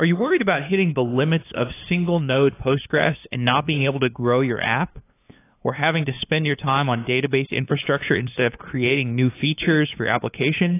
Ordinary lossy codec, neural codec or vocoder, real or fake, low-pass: AAC, 24 kbps; codec, 16 kHz, 2 kbps, FunCodec, trained on LibriTTS, 25 frames a second; fake; 3.6 kHz